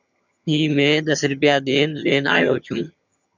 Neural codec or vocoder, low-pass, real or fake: vocoder, 22.05 kHz, 80 mel bands, HiFi-GAN; 7.2 kHz; fake